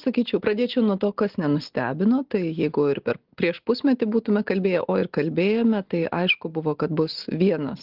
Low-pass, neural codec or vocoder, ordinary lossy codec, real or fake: 5.4 kHz; none; Opus, 16 kbps; real